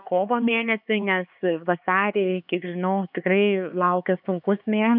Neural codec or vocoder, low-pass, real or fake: codec, 16 kHz, 4 kbps, X-Codec, HuBERT features, trained on LibriSpeech; 5.4 kHz; fake